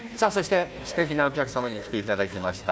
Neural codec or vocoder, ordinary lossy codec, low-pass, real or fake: codec, 16 kHz, 1 kbps, FunCodec, trained on Chinese and English, 50 frames a second; none; none; fake